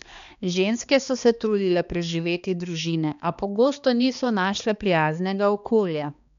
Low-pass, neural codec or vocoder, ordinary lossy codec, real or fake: 7.2 kHz; codec, 16 kHz, 2 kbps, X-Codec, HuBERT features, trained on balanced general audio; none; fake